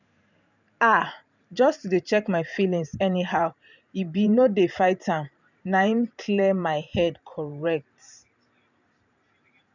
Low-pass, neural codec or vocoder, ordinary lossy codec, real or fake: 7.2 kHz; vocoder, 44.1 kHz, 128 mel bands every 512 samples, BigVGAN v2; none; fake